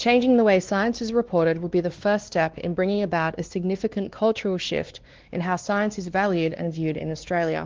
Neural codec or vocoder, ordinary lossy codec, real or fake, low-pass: codec, 16 kHz, 2 kbps, X-Codec, WavLM features, trained on Multilingual LibriSpeech; Opus, 16 kbps; fake; 7.2 kHz